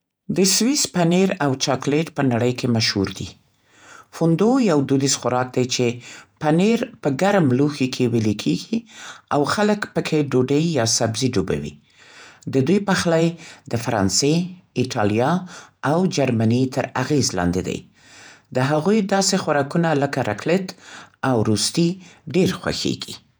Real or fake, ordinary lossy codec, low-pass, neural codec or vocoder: fake; none; none; vocoder, 48 kHz, 128 mel bands, Vocos